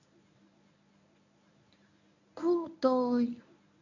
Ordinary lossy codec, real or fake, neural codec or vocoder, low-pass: none; fake; codec, 24 kHz, 0.9 kbps, WavTokenizer, medium speech release version 1; 7.2 kHz